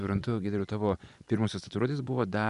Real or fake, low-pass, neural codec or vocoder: fake; 10.8 kHz; vocoder, 24 kHz, 100 mel bands, Vocos